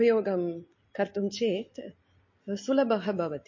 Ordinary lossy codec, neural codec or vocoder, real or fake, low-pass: MP3, 32 kbps; none; real; 7.2 kHz